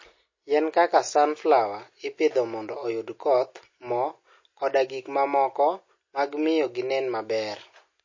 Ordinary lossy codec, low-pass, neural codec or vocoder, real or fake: MP3, 32 kbps; 7.2 kHz; none; real